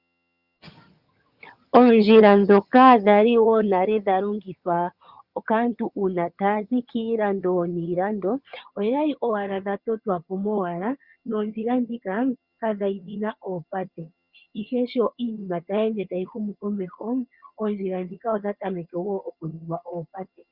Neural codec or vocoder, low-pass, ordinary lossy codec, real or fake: vocoder, 22.05 kHz, 80 mel bands, HiFi-GAN; 5.4 kHz; Opus, 64 kbps; fake